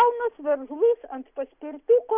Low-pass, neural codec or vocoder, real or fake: 3.6 kHz; none; real